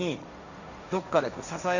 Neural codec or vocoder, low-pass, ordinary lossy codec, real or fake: codec, 16 kHz, 1.1 kbps, Voila-Tokenizer; 7.2 kHz; none; fake